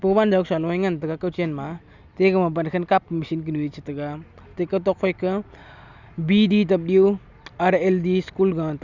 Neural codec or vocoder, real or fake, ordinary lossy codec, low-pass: none; real; none; 7.2 kHz